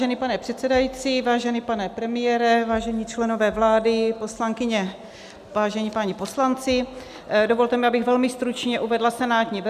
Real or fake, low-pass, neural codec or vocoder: real; 14.4 kHz; none